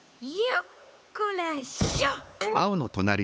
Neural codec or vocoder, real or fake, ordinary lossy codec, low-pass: codec, 16 kHz, 4 kbps, X-Codec, HuBERT features, trained on LibriSpeech; fake; none; none